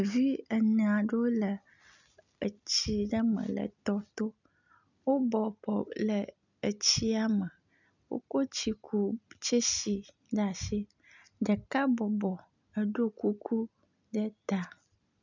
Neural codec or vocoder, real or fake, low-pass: none; real; 7.2 kHz